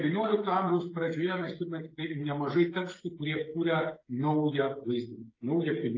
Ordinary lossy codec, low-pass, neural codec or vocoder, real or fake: AAC, 32 kbps; 7.2 kHz; codec, 16 kHz, 16 kbps, FreqCodec, smaller model; fake